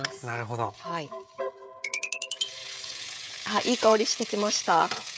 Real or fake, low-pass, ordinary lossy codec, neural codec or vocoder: fake; none; none; codec, 16 kHz, 16 kbps, FreqCodec, smaller model